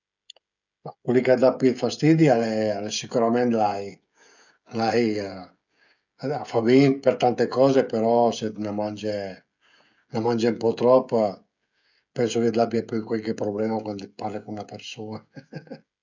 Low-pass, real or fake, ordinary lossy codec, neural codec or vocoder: 7.2 kHz; fake; none; codec, 16 kHz, 16 kbps, FreqCodec, smaller model